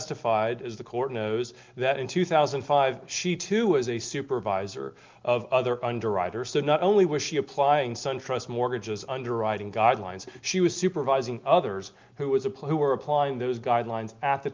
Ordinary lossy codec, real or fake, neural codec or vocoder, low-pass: Opus, 24 kbps; real; none; 7.2 kHz